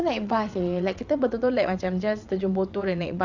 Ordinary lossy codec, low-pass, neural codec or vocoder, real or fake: none; 7.2 kHz; vocoder, 22.05 kHz, 80 mel bands, WaveNeXt; fake